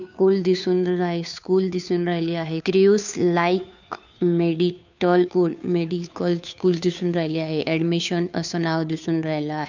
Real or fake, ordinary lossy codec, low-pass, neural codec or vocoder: fake; none; 7.2 kHz; codec, 16 kHz, 2 kbps, FunCodec, trained on Chinese and English, 25 frames a second